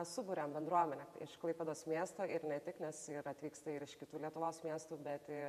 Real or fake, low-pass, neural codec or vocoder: fake; 14.4 kHz; vocoder, 48 kHz, 128 mel bands, Vocos